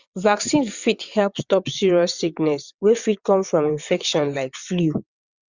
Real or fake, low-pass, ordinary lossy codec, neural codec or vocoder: fake; 7.2 kHz; Opus, 64 kbps; vocoder, 22.05 kHz, 80 mel bands, WaveNeXt